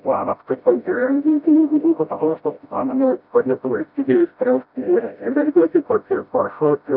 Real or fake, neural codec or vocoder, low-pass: fake; codec, 16 kHz, 0.5 kbps, FreqCodec, smaller model; 5.4 kHz